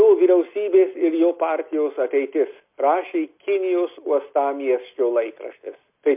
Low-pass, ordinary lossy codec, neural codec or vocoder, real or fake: 3.6 kHz; MP3, 32 kbps; none; real